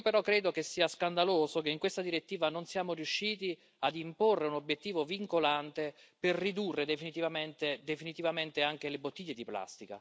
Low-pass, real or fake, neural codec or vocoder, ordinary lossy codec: none; real; none; none